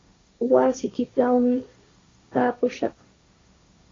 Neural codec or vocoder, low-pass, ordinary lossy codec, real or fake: codec, 16 kHz, 1.1 kbps, Voila-Tokenizer; 7.2 kHz; AAC, 32 kbps; fake